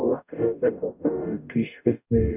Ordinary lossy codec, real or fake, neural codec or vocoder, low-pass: none; fake; codec, 44.1 kHz, 0.9 kbps, DAC; 3.6 kHz